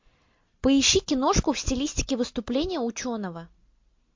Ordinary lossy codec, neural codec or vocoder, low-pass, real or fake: MP3, 48 kbps; none; 7.2 kHz; real